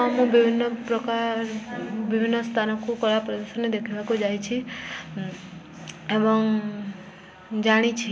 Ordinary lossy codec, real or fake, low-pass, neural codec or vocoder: none; real; none; none